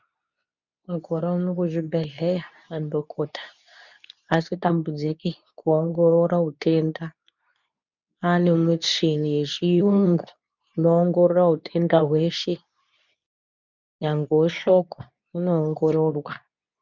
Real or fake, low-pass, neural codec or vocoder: fake; 7.2 kHz; codec, 24 kHz, 0.9 kbps, WavTokenizer, medium speech release version 2